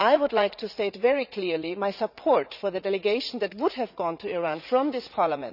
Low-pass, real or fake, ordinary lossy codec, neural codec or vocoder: 5.4 kHz; fake; none; vocoder, 44.1 kHz, 80 mel bands, Vocos